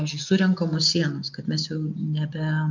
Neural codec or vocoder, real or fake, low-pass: none; real; 7.2 kHz